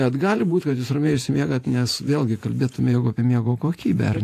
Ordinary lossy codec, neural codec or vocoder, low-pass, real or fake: AAC, 64 kbps; vocoder, 48 kHz, 128 mel bands, Vocos; 14.4 kHz; fake